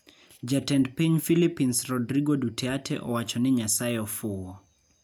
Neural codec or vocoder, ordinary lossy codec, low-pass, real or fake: none; none; none; real